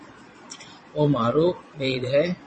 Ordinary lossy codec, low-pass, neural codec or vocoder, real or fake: MP3, 32 kbps; 9.9 kHz; vocoder, 22.05 kHz, 80 mel bands, Vocos; fake